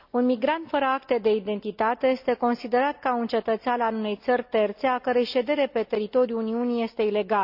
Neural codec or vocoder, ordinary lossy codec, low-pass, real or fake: none; AAC, 48 kbps; 5.4 kHz; real